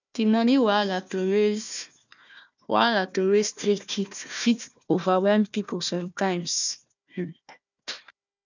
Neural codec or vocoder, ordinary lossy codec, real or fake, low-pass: codec, 16 kHz, 1 kbps, FunCodec, trained on Chinese and English, 50 frames a second; none; fake; 7.2 kHz